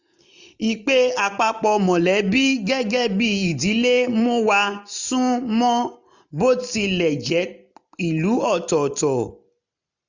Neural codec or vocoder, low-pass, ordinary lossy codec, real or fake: none; 7.2 kHz; none; real